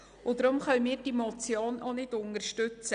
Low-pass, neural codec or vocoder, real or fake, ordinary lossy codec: 9.9 kHz; none; real; none